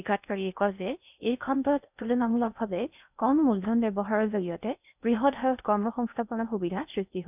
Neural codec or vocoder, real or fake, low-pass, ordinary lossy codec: codec, 16 kHz in and 24 kHz out, 0.6 kbps, FocalCodec, streaming, 2048 codes; fake; 3.6 kHz; none